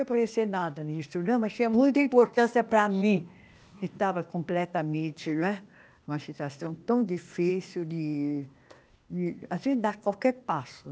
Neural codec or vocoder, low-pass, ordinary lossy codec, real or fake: codec, 16 kHz, 0.8 kbps, ZipCodec; none; none; fake